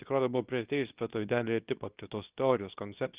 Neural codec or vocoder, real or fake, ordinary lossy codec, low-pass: codec, 24 kHz, 0.9 kbps, WavTokenizer, medium speech release version 2; fake; Opus, 32 kbps; 3.6 kHz